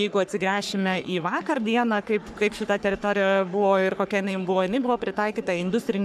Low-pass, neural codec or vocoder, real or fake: 14.4 kHz; codec, 44.1 kHz, 3.4 kbps, Pupu-Codec; fake